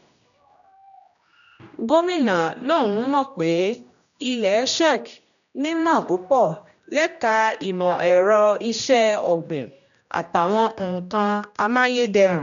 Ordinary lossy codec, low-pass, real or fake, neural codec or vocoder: none; 7.2 kHz; fake; codec, 16 kHz, 1 kbps, X-Codec, HuBERT features, trained on general audio